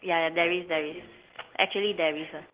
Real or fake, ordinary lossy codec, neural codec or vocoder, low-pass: real; Opus, 16 kbps; none; 3.6 kHz